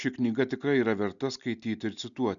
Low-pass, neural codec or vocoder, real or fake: 7.2 kHz; none; real